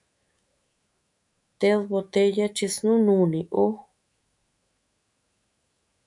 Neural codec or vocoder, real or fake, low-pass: autoencoder, 48 kHz, 128 numbers a frame, DAC-VAE, trained on Japanese speech; fake; 10.8 kHz